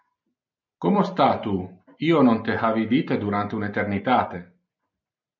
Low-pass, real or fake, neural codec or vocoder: 7.2 kHz; real; none